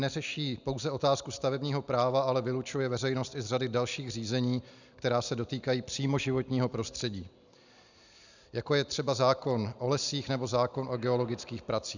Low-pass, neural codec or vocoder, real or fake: 7.2 kHz; none; real